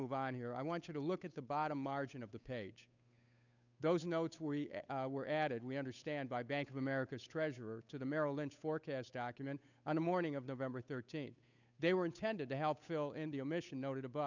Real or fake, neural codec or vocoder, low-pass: fake; codec, 16 kHz, 8 kbps, FunCodec, trained on Chinese and English, 25 frames a second; 7.2 kHz